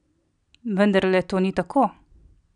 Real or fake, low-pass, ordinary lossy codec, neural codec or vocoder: real; 9.9 kHz; none; none